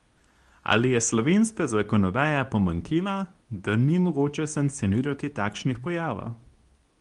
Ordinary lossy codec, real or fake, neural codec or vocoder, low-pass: Opus, 32 kbps; fake; codec, 24 kHz, 0.9 kbps, WavTokenizer, medium speech release version 2; 10.8 kHz